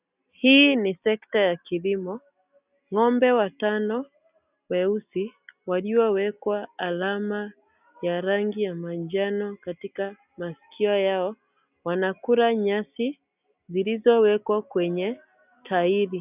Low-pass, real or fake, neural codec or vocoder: 3.6 kHz; real; none